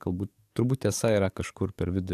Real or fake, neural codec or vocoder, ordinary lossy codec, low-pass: fake; codec, 44.1 kHz, 7.8 kbps, DAC; AAC, 96 kbps; 14.4 kHz